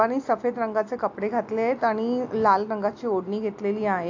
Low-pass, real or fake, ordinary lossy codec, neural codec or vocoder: 7.2 kHz; real; AAC, 48 kbps; none